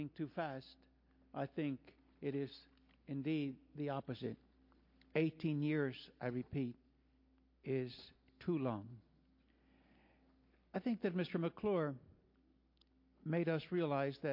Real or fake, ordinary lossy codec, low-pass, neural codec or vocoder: real; MP3, 32 kbps; 5.4 kHz; none